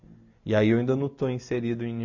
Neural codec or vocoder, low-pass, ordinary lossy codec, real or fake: none; 7.2 kHz; AAC, 48 kbps; real